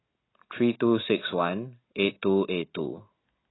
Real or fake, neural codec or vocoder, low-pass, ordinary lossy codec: real; none; 7.2 kHz; AAC, 16 kbps